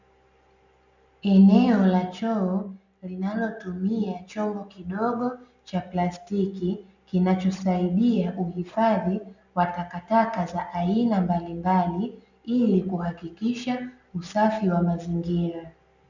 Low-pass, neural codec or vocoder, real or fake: 7.2 kHz; none; real